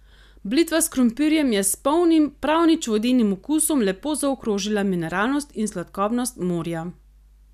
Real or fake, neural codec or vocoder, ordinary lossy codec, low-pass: real; none; none; 14.4 kHz